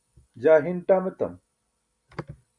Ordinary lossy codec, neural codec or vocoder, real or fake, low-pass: MP3, 96 kbps; none; real; 9.9 kHz